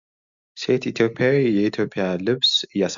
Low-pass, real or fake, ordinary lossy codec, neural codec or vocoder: 7.2 kHz; real; Opus, 64 kbps; none